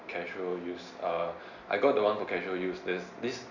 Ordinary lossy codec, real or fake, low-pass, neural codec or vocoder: none; real; 7.2 kHz; none